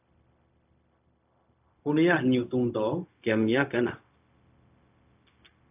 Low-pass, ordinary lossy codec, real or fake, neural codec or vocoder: 3.6 kHz; AAC, 32 kbps; fake; codec, 16 kHz, 0.4 kbps, LongCat-Audio-Codec